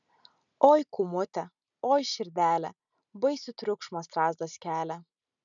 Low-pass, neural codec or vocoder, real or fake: 7.2 kHz; none; real